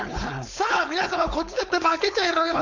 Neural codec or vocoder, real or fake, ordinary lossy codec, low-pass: codec, 16 kHz, 4.8 kbps, FACodec; fake; none; 7.2 kHz